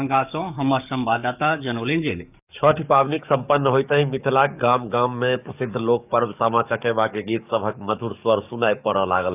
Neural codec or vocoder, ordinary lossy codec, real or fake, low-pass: codec, 44.1 kHz, 7.8 kbps, DAC; none; fake; 3.6 kHz